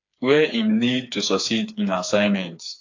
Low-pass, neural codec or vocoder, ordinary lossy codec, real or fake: 7.2 kHz; codec, 16 kHz, 4 kbps, FreqCodec, smaller model; AAC, 48 kbps; fake